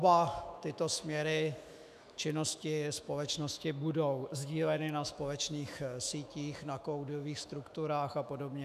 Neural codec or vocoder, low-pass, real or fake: autoencoder, 48 kHz, 128 numbers a frame, DAC-VAE, trained on Japanese speech; 14.4 kHz; fake